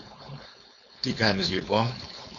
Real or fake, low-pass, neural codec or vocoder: fake; 7.2 kHz; codec, 16 kHz, 4.8 kbps, FACodec